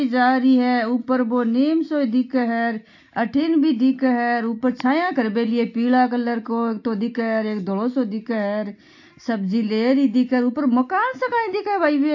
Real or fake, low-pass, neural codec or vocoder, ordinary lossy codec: real; 7.2 kHz; none; AAC, 48 kbps